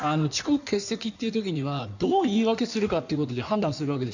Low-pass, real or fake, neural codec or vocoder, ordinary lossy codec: 7.2 kHz; fake; codec, 16 kHz in and 24 kHz out, 1.1 kbps, FireRedTTS-2 codec; none